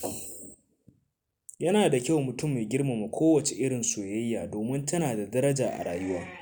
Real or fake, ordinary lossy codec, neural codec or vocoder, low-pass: real; none; none; none